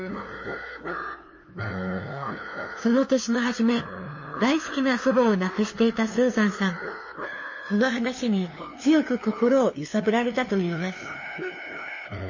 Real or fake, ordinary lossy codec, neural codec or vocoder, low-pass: fake; MP3, 32 kbps; codec, 16 kHz, 2 kbps, FunCodec, trained on LibriTTS, 25 frames a second; 7.2 kHz